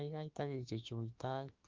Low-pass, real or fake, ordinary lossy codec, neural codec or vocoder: 7.2 kHz; fake; Opus, 32 kbps; autoencoder, 48 kHz, 32 numbers a frame, DAC-VAE, trained on Japanese speech